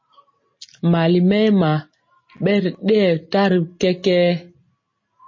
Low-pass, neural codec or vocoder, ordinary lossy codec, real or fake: 7.2 kHz; none; MP3, 32 kbps; real